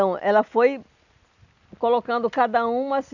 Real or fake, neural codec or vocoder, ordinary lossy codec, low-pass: real; none; none; 7.2 kHz